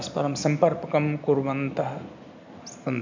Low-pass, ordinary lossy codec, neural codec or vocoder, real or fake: 7.2 kHz; MP3, 64 kbps; none; real